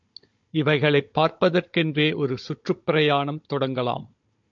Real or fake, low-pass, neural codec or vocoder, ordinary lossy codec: fake; 7.2 kHz; codec, 16 kHz, 16 kbps, FunCodec, trained on Chinese and English, 50 frames a second; MP3, 48 kbps